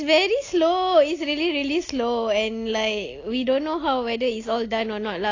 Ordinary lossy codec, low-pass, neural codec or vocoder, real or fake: AAC, 32 kbps; 7.2 kHz; none; real